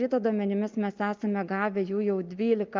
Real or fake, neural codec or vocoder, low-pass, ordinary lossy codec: real; none; 7.2 kHz; Opus, 32 kbps